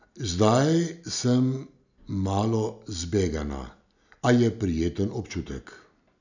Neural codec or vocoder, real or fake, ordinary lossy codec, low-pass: none; real; none; 7.2 kHz